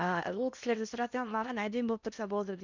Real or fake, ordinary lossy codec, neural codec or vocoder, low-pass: fake; none; codec, 16 kHz in and 24 kHz out, 0.8 kbps, FocalCodec, streaming, 65536 codes; 7.2 kHz